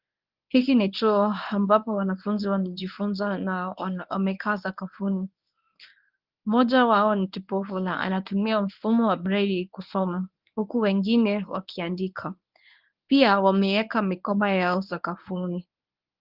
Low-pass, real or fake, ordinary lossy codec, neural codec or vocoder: 5.4 kHz; fake; Opus, 24 kbps; codec, 24 kHz, 0.9 kbps, WavTokenizer, medium speech release version 1